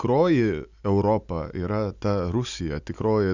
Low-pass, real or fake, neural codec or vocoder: 7.2 kHz; real; none